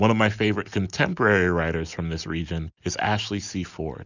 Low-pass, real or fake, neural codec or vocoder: 7.2 kHz; real; none